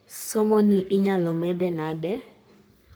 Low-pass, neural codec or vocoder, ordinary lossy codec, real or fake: none; codec, 44.1 kHz, 3.4 kbps, Pupu-Codec; none; fake